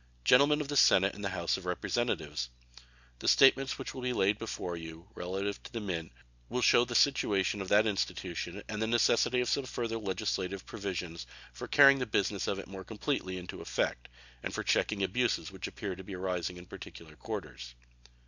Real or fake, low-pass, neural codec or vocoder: real; 7.2 kHz; none